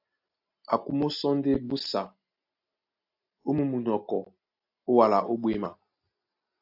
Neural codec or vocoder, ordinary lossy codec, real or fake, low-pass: none; AAC, 48 kbps; real; 5.4 kHz